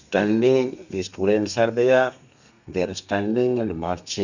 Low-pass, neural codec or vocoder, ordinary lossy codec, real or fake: 7.2 kHz; codec, 32 kHz, 1.9 kbps, SNAC; none; fake